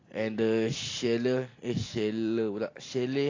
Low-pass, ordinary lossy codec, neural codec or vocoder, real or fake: 7.2 kHz; AAC, 32 kbps; none; real